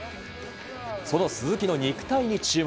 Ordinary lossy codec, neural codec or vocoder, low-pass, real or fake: none; none; none; real